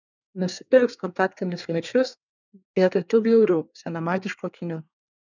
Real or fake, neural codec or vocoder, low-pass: fake; codec, 24 kHz, 1 kbps, SNAC; 7.2 kHz